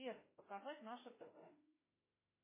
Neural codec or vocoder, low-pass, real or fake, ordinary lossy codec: autoencoder, 48 kHz, 32 numbers a frame, DAC-VAE, trained on Japanese speech; 3.6 kHz; fake; MP3, 16 kbps